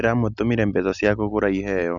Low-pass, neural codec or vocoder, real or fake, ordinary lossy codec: 7.2 kHz; none; real; none